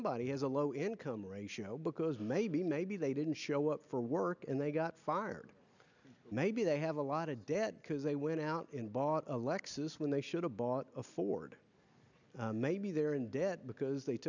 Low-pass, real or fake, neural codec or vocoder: 7.2 kHz; real; none